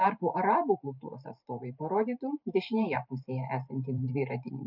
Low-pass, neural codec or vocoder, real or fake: 5.4 kHz; vocoder, 44.1 kHz, 128 mel bands every 512 samples, BigVGAN v2; fake